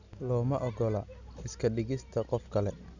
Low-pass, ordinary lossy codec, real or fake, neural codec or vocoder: 7.2 kHz; none; real; none